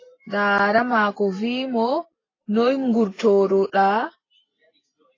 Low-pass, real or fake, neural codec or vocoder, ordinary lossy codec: 7.2 kHz; real; none; AAC, 32 kbps